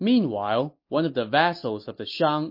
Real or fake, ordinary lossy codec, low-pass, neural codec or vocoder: real; MP3, 32 kbps; 5.4 kHz; none